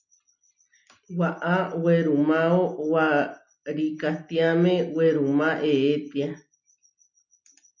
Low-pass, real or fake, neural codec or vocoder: 7.2 kHz; real; none